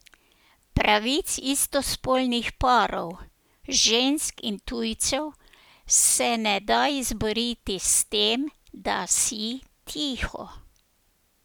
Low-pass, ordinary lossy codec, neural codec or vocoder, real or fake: none; none; none; real